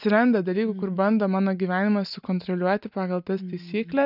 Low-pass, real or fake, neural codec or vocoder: 5.4 kHz; real; none